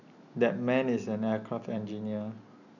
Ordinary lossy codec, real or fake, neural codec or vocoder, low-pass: none; real; none; 7.2 kHz